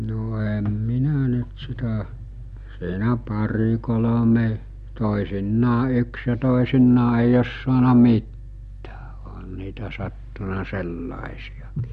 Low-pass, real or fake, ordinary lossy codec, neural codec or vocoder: 14.4 kHz; real; MP3, 48 kbps; none